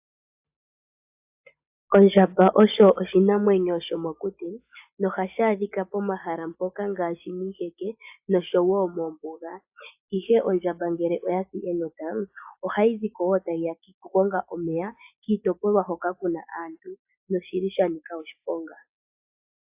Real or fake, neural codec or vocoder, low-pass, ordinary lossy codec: real; none; 3.6 kHz; AAC, 32 kbps